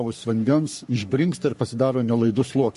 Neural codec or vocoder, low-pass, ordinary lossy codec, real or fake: codec, 44.1 kHz, 3.4 kbps, Pupu-Codec; 14.4 kHz; MP3, 48 kbps; fake